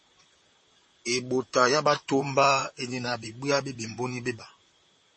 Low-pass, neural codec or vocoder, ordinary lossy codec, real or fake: 10.8 kHz; vocoder, 44.1 kHz, 128 mel bands, Pupu-Vocoder; MP3, 32 kbps; fake